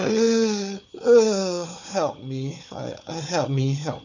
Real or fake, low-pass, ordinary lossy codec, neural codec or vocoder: fake; 7.2 kHz; none; codec, 16 kHz, 4 kbps, FunCodec, trained on Chinese and English, 50 frames a second